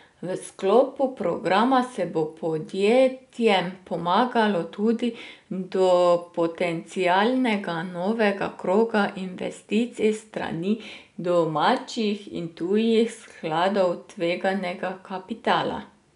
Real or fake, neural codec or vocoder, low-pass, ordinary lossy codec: real; none; 10.8 kHz; none